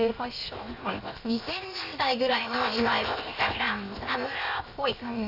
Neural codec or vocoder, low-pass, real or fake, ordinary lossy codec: codec, 16 kHz, 0.7 kbps, FocalCodec; 5.4 kHz; fake; none